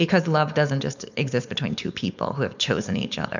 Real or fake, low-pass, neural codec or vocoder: fake; 7.2 kHz; codec, 16 kHz, 4.8 kbps, FACodec